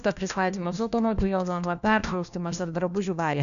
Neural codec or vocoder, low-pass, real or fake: codec, 16 kHz, 1 kbps, FunCodec, trained on LibriTTS, 50 frames a second; 7.2 kHz; fake